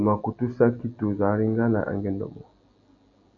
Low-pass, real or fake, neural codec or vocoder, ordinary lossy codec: 7.2 kHz; real; none; AAC, 64 kbps